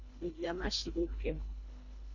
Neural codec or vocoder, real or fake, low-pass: codec, 24 kHz, 1.5 kbps, HILCodec; fake; 7.2 kHz